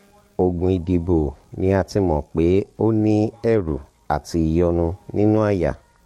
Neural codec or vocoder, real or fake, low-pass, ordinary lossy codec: codec, 44.1 kHz, 7.8 kbps, DAC; fake; 19.8 kHz; MP3, 64 kbps